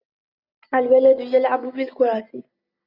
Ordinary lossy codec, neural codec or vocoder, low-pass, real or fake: Opus, 64 kbps; none; 5.4 kHz; real